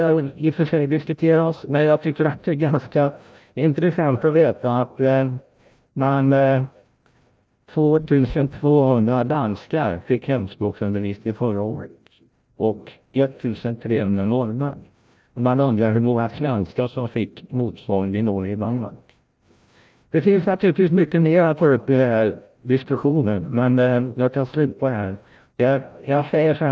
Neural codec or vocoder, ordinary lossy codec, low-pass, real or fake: codec, 16 kHz, 0.5 kbps, FreqCodec, larger model; none; none; fake